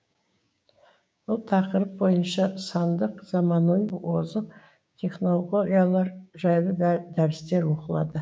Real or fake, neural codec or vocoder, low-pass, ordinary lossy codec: fake; codec, 16 kHz, 6 kbps, DAC; none; none